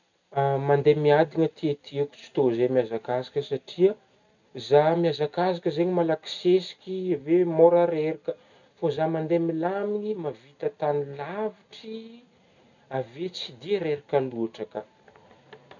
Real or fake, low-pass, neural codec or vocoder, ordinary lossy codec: real; 7.2 kHz; none; none